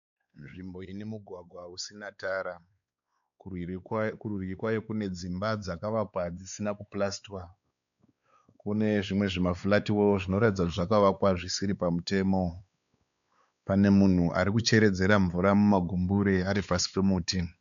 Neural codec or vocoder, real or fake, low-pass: codec, 16 kHz, 4 kbps, X-Codec, WavLM features, trained on Multilingual LibriSpeech; fake; 7.2 kHz